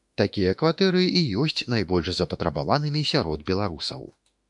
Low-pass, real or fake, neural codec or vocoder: 10.8 kHz; fake; autoencoder, 48 kHz, 32 numbers a frame, DAC-VAE, trained on Japanese speech